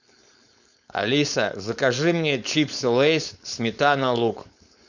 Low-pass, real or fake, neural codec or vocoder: 7.2 kHz; fake; codec, 16 kHz, 4.8 kbps, FACodec